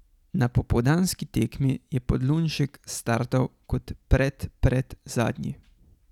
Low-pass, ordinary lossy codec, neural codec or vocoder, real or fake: 19.8 kHz; none; none; real